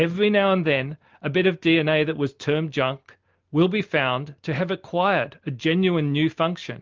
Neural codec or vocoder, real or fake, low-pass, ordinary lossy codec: none; real; 7.2 kHz; Opus, 24 kbps